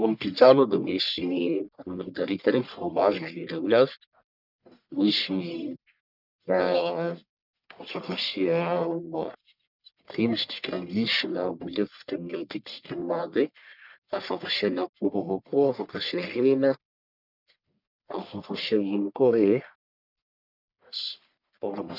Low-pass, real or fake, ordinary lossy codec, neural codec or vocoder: 5.4 kHz; fake; none; codec, 44.1 kHz, 1.7 kbps, Pupu-Codec